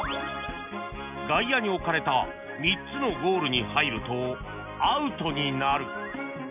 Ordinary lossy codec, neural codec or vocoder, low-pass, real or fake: none; none; 3.6 kHz; real